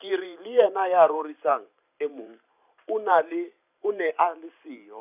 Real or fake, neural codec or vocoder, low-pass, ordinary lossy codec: fake; vocoder, 44.1 kHz, 128 mel bands every 512 samples, BigVGAN v2; 3.6 kHz; none